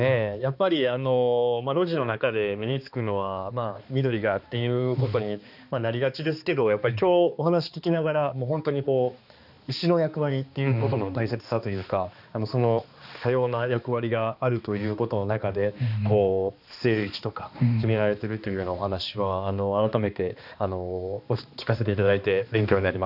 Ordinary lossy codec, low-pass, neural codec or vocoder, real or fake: none; 5.4 kHz; codec, 16 kHz, 2 kbps, X-Codec, HuBERT features, trained on balanced general audio; fake